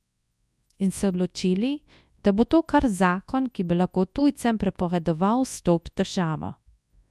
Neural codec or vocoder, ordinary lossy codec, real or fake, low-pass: codec, 24 kHz, 0.9 kbps, WavTokenizer, large speech release; none; fake; none